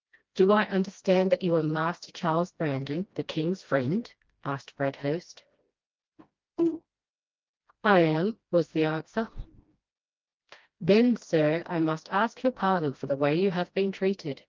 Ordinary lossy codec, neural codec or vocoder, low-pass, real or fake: Opus, 24 kbps; codec, 16 kHz, 1 kbps, FreqCodec, smaller model; 7.2 kHz; fake